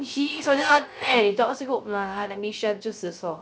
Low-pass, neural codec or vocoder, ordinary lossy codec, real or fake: none; codec, 16 kHz, 0.3 kbps, FocalCodec; none; fake